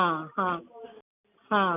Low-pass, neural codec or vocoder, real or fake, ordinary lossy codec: 3.6 kHz; none; real; none